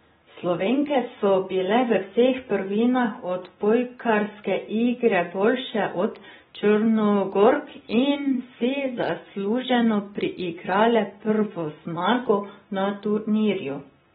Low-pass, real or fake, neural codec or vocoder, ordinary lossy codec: 9.9 kHz; real; none; AAC, 16 kbps